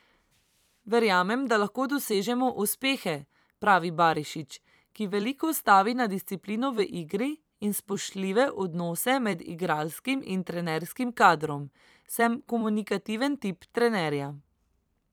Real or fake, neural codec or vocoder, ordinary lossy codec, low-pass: fake; vocoder, 44.1 kHz, 128 mel bands every 256 samples, BigVGAN v2; none; none